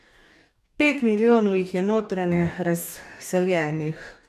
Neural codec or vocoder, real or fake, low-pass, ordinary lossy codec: codec, 44.1 kHz, 2.6 kbps, DAC; fake; 14.4 kHz; none